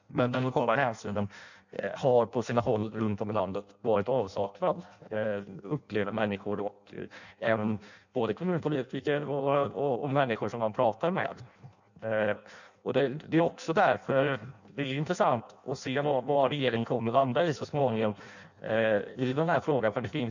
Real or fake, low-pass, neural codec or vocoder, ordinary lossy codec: fake; 7.2 kHz; codec, 16 kHz in and 24 kHz out, 0.6 kbps, FireRedTTS-2 codec; none